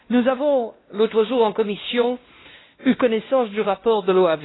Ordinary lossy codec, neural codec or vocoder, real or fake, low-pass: AAC, 16 kbps; codec, 16 kHz, 1 kbps, X-Codec, WavLM features, trained on Multilingual LibriSpeech; fake; 7.2 kHz